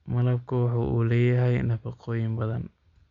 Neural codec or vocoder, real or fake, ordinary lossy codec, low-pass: none; real; Opus, 64 kbps; 7.2 kHz